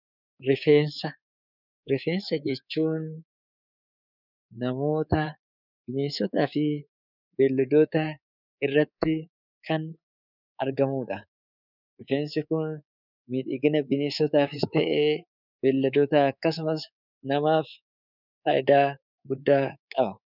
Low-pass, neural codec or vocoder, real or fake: 5.4 kHz; codec, 24 kHz, 3.1 kbps, DualCodec; fake